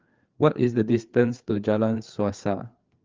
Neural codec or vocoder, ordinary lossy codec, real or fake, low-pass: codec, 16 kHz, 4 kbps, FreqCodec, larger model; Opus, 32 kbps; fake; 7.2 kHz